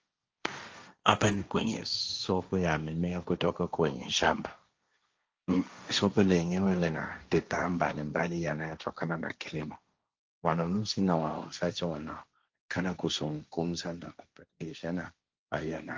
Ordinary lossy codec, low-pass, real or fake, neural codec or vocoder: Opus, 24 kbps; 7.2 kHz; fake; codec, 16 kHz, 1.1 kbps, Voila-Tokenizer